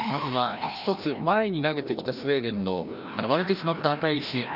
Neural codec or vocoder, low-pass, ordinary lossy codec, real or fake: codec, 16 kHz, 1 kbps, FreqCodec, larger model; 5.4 kHz; none; fake